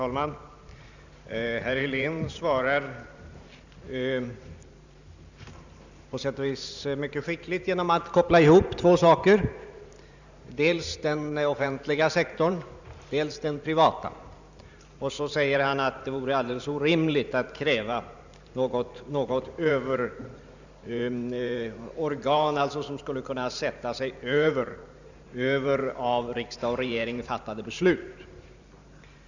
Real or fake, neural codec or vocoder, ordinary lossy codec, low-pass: fake; vocoder, 44.1 kHz, 128 mel bands every 512 samples, BigVGAN v2; none; 7.2 kHz